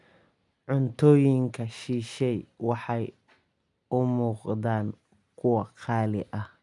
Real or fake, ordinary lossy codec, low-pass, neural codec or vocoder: real; none; 10.8 kHz; none